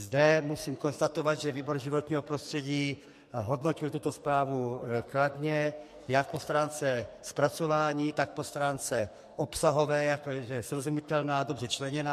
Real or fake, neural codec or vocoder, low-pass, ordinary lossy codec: fake; codec, 44.1 kHz, 2.6 kbps, SNAC; 14.4 kHz; MP3, 64 kbps